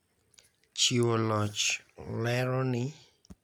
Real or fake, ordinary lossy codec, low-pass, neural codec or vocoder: real; none; none; none